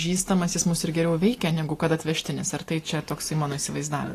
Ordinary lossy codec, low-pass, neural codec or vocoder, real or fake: AAC, 48 kbps; 14.4 kHz; none; real